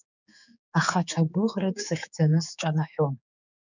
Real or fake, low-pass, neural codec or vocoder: fake; 7.2 kHz; codec, 16 kHz, 4 kbps, X-Codec, HuBERT features, trained on general audio